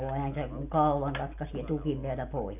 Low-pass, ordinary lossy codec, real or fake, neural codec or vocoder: 3.6 kHz; AAC, 32 kbps; real; none